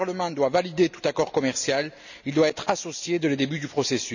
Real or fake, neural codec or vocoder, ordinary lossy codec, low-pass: real; none; none; 7.2 kHz